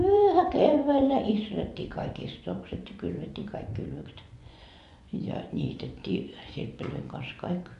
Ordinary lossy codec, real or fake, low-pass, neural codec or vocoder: MP3, 64 kbps; fake; 10.8 kHz; vocoder, 24 kHz, 100 mel bands, Vocos